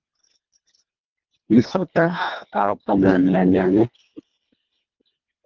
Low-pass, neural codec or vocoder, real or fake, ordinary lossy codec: 7.2 kHz; codec, 24 kHz, 1.5 kbps, HILCodec; fake; Opus, 32 kbps